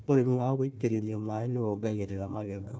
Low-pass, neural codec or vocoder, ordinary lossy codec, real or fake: none; codec, 16 kHz, 1 kbps, FunCodec, trained on Chinese and English, 50 frames a second; none; fake